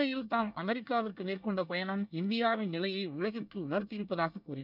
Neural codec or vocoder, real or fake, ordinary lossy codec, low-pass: codec, 24 kHz, 1 kbps, SNAC; fake; none; 5.4 kHz